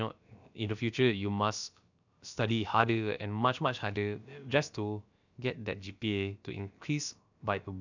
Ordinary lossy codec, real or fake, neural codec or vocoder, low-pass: none; fake; codec, 16 kHz, about 1 kbps, DyCAST, with the encoder's durations; 7.2 kHz